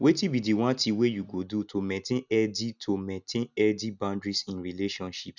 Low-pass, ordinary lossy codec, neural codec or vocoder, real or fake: 7.2 kHz; none; none; real